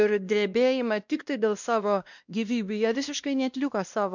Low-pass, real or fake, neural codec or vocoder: 7.2 kHz; fake; codec, 16 kHz, 1 kbps, X-Codec, WavLM features, trained on Multilingual LibriSpeech